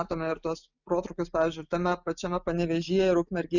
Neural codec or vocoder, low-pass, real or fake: none; 7.2 kHz; real